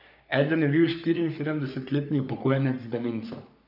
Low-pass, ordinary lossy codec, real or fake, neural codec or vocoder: 5.4 kHz; none; fake; codec, 44.1 kHz, 3.4 kbps, Pupu-Codec